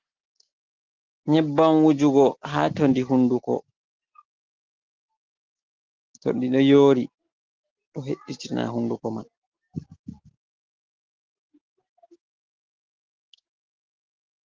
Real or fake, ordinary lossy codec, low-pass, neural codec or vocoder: real; Opus, 16 kbps; 7.2 kHz; none